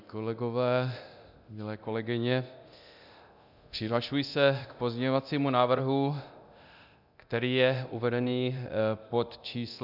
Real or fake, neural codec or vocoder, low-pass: fake; codec, 24 kHz, 0.9 kbps, DualCodec; 5.4 kHz